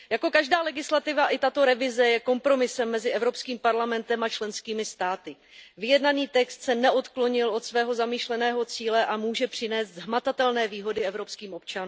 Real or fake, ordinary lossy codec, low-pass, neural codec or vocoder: real; none; none; none